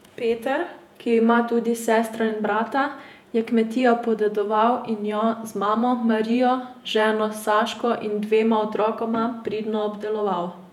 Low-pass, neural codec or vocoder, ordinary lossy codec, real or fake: 19.8 kHz; vocoder, 48 kHz, 128 mel bands, Vocos; none; fake